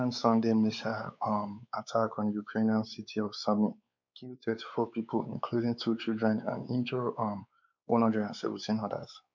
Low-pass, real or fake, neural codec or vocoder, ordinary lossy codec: 7.2 kHz; fake; codec, 16 kHz, 4 kbps, X-Codec, WavLM features, trained on Multilingual LibriSpeech; none